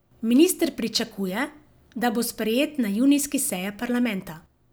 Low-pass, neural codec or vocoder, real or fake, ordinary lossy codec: none; none; real; none